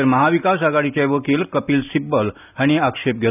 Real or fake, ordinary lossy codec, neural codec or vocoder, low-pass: real; none; none; 3.6 kHz